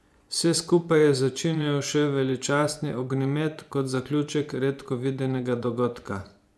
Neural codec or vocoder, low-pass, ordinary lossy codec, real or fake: vocoder, 24 kHz, 100 mel bands, Vocos; none; none; fake